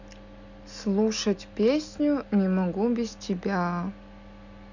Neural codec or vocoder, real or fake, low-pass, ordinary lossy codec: none; real; 7.2 kHz; none